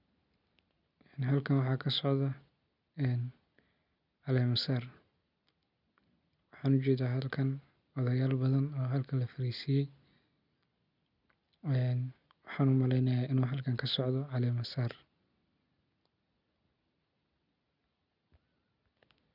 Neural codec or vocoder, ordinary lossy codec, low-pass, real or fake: none; none; 5.4 kHz; real